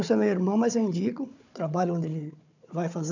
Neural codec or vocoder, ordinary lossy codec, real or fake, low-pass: codec, 16 kHz, 4 kbps, FunCodec, trained on Chinese and English, 50 frames a second; none; fake; 7.2 kHz